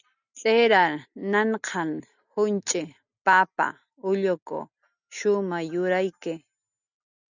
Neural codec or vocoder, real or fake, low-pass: none; real; 7.2 kHz